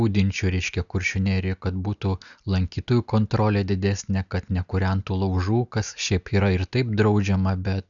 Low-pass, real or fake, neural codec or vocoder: 7.2 kHz; real; none